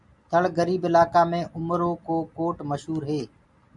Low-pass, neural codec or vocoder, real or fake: 10.8 kHz; none; real